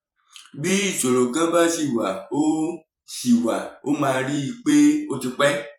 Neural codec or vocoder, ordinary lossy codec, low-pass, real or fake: vocoder, 44.1 kHz, 128 mel bands every 512 samples, BigVGAN v2; none; 19.8 kHz; fake